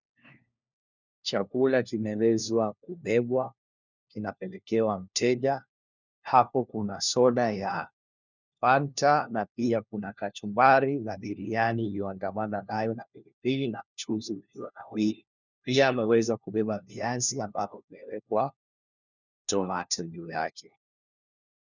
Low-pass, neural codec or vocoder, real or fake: 7.2 kHz; codec, 16 kHz, 1 kbps, FunCodec, trained on LibriTTS, 50 frames a second; fake